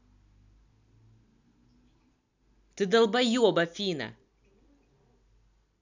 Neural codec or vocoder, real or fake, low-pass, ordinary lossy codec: none; real; 7.2 kHz; none